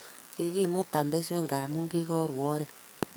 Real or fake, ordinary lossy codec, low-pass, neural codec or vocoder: fake; none; none; codec, 44.1 kHz, 2.6 kbps, SNAC